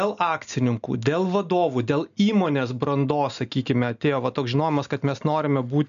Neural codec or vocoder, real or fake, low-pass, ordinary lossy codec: none; real; 7.2 kHz; MP3, 96 kbps